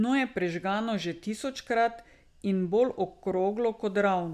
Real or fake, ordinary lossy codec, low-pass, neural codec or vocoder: real; none; 14.4 kHz; none